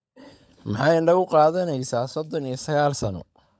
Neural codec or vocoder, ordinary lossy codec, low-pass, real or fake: codec, 16 kHz, 16 kbps, FunCodec, trained on LibriTTS, 50 frames a second; none; none; fake